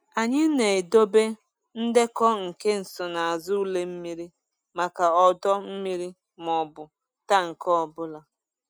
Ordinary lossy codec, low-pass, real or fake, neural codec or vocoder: none; none; real; none